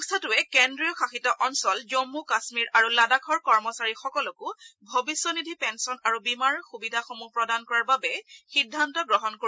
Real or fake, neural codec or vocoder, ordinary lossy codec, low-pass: real; none; none; none